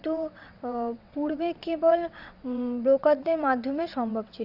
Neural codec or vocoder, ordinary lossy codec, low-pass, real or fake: vocoder, 22.05 kHz, 80 mel bands, WaveNeXt; Opus, 64 kbps; 5.4 kHz; fake